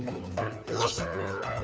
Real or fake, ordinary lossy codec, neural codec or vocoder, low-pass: fake; none; codec, 16 kHz, 4 kbps, FunCodec, trained on LibriTTS, 50 frames a second; none